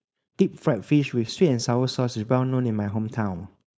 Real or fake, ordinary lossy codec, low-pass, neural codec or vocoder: fake; none; none; codec, 16 kHz, 4.8 kbps, FACodec